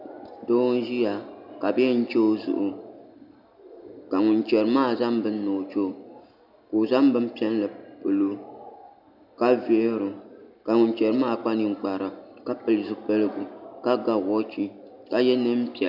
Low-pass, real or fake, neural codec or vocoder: 5.4 kHz; real; none